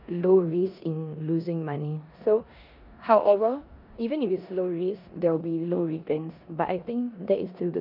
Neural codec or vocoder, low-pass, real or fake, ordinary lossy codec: codec, 16 kHz in and 24 kHz out, 0.9 kbps, LongCat-Audio-Codec, four codebook decoder; 5.4 kHz; fake; none